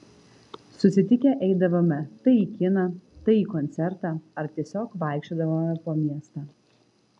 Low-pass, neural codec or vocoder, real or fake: 10.8 kHz; none; real